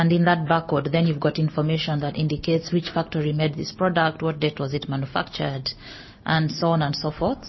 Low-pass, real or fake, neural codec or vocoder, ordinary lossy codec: 7.2 kHz; real; none; MP3, 24 kbps